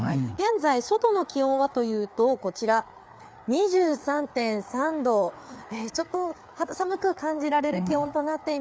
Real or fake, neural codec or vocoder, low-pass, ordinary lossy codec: fake; codec, 16 kHz, 4 kbps, FreqCodec, larger model; none; none